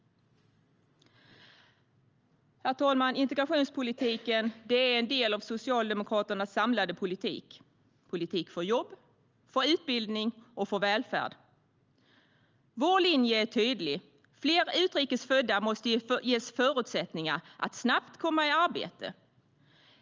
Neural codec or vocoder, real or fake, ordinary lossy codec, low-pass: none; real; Opus, 24 kbps; 7.2 kHz